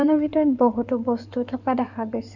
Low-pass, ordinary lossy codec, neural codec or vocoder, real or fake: 7.2 kHz; none; codec, 16 kHz in and 24 kHz out, 2.2 kbps, FireRedTTS-2 codec; fake